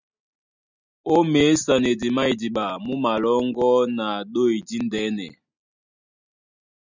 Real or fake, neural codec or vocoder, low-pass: real; none; 7.2 kHz